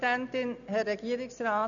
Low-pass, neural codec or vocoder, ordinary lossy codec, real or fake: 7.2 kHz; none; none; real